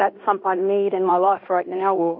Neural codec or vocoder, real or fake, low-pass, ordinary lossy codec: codec, 16 kHz in and 24 kHz out, 0.9 kbps, LongCat-Audio-Codec, fine tuned four codebook decoder; fake; 5.4 kHz; MP3, 48 kbps